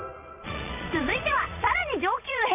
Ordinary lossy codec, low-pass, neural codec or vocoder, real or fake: none; 3.6 kHz; vocoder, 22.05 kHz, 80 mel bands, Vocos; fake